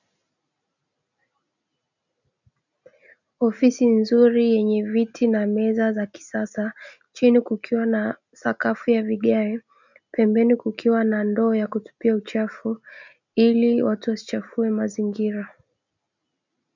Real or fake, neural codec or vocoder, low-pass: real; none; 7.2 kHz